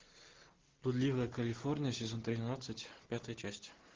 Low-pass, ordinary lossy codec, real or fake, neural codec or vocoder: 7.2 kHz; Opus, 16 kbps; real; none